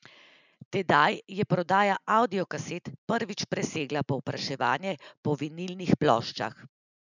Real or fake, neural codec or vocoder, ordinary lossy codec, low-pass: real; none; none; 7.2 kHz